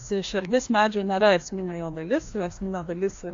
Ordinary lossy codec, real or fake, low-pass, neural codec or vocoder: AAC, 48 kbps; fake; 7.2 kHz; codec, 16 kHz, 1 kbps, FreqCodec, larger model